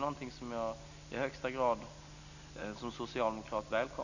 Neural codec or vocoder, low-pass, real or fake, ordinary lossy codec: none; 7.2 kHz; real; none